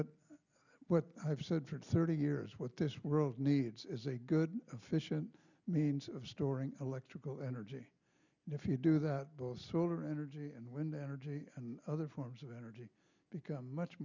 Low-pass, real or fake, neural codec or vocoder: 7.2 kHz; real; none